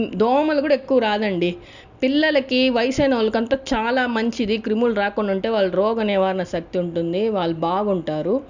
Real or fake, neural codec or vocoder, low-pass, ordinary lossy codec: real; none; 7.2 kHz; none